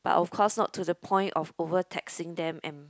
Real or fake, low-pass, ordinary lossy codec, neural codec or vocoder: real; none; none; none